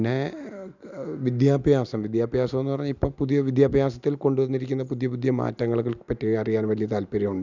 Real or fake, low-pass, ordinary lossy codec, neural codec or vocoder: real; 7.2 kHz; none; none